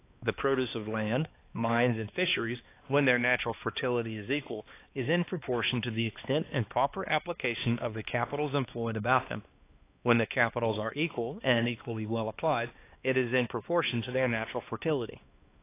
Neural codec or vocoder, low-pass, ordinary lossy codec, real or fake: codec, 16 kHz, 2 kbps, X-Codec, HuBERT features, trained on balanced general audio; 3.6 kHz; AAC, 24 kbps; fake